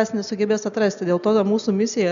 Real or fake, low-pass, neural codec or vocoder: real; 7.2 kHz; none